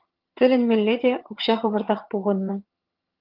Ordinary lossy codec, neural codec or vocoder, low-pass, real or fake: Opus, 32 kbps; vocoder, 22.05 kHz, 80 mel bands, HiFi-GAN; 5.4 kHz; fake